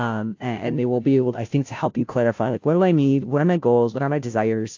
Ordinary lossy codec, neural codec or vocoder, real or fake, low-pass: AAC, 48 kbps; codec, 16 kHz, 0.5 kbps, FunCodec, trained on Chinese and English, 25 frames a second; fake; 7.2 kHz